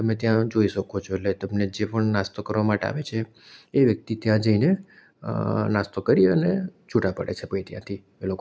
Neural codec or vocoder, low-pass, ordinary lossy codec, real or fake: none; none; none; real